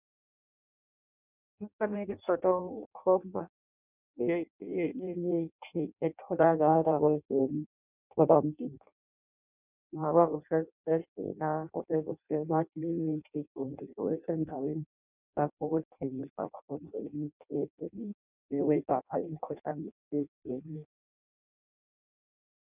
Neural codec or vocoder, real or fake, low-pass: codec, 16 kHz in and 24 kHz out, 0.6 kbps, FireRedTTS-2 codec; fake; 3.6 kHz